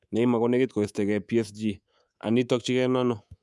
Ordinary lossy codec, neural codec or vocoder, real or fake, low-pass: none; codec, 24 kHz, 3.1 kbps, DualCodec; fake; none